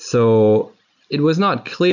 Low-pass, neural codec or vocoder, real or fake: 7.2 kHz; none; real